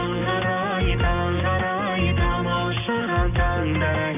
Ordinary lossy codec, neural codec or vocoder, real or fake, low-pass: none; none; real; 3.6 kHz